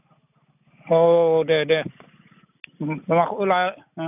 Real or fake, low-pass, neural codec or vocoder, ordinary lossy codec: real; 3.6 kHz; none; none